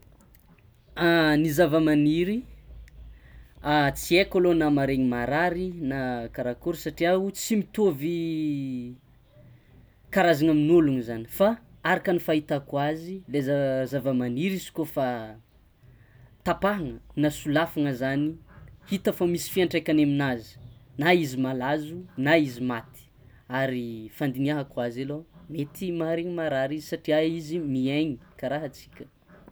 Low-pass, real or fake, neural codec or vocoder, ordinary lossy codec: none; real; none; none